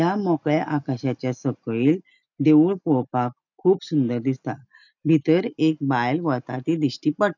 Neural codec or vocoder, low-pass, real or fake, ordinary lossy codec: none; 7.2 kHz; real; none